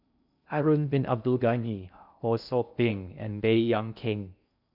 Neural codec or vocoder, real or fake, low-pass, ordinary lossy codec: codec, 16 kHz in and 24 kHz out, 0.6 kbps, FocalCodec, streaming, 2048 codes; fake; 5.4 kHz; none